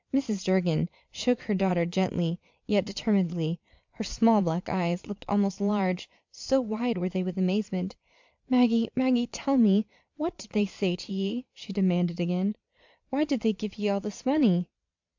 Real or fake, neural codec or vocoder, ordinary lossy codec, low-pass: fake; vocoder, 22.05 kHz, 80 mel bands, Vocos; MP3, 64 kbps; 7.2 kHz